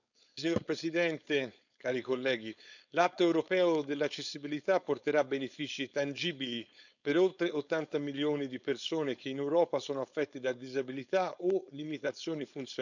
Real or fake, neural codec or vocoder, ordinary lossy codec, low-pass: fake; codec, 16 kHz, 4.8 kbps, FACodec; none; 7.2 kHz